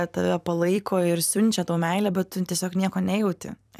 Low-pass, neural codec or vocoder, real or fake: 14.4 kHz; vocoder, 44.1 kHz, 128 mel bands every 256 samples, BigVGAN v2; fake